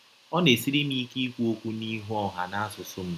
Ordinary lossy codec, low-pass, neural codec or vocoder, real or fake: none; 14.4 kHz; none; real